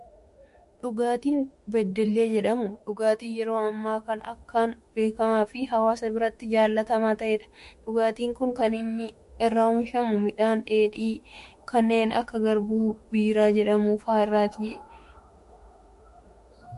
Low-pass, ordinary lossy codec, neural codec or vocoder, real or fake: 14.4 kHz; MP3, 48 kbps; autoencoder, 48 kHz, 32 numbers a frame, DAC-VAE, trained on Japanese speech; fake